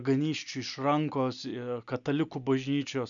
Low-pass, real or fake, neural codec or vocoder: 7.2 kHz; real; none